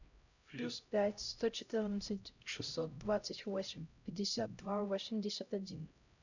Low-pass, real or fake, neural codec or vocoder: 7.2 kHz; fake; codec, 16 kHz, 0.5 kbps, X-Codec, HuBERT features, trained on LibriSpeech